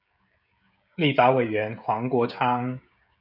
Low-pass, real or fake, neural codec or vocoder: 5.4 kHz; fake; codec, 16 kHz, 16 kbps, FreqCodec, smaller model